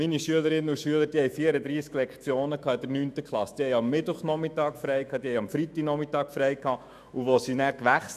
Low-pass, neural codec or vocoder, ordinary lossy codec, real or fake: 14.4 kHz; autoencoder, 48 kHz, 128 numbers a frame, DAC-VAE, trained on Japanese speech; none; fake